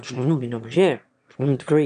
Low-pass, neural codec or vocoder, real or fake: 9.9 kHz; autoencoder, 22.05 kHz, a latent of 192 numbers a frame, VITS, trained on one speaker; fake